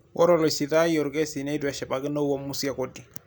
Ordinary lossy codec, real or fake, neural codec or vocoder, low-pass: none; real; none; none